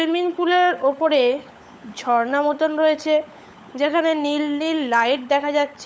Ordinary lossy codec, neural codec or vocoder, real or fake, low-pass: none; codec, 16 kHz, 16 kbps, FunCodec, trained on LibriTTS, 50 frames a second; fake; none